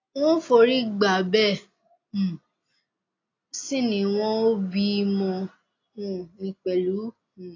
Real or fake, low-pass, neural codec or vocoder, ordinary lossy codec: real; 7.2 kHz; none; AAC, 32 kbps